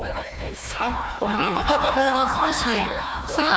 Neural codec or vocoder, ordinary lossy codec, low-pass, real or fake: codec, 16 kHz, 1 kbps, FunCodec, trained on Chinese and English, 50 frames a second; none; none; fake